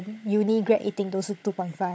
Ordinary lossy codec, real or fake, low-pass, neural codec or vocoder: none; fake; none; codec, 16 kHz, 16 kbps, FunCodec, trained on Chinese and English, 50 frames a second